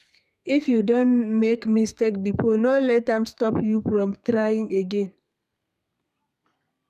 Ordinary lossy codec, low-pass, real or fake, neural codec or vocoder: none; 14.4 kHz; fake; codec, 32 kHz, 1.9 kbps, SNAC